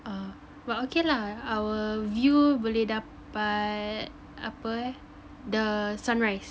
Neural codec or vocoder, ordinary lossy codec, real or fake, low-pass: none; none; real; none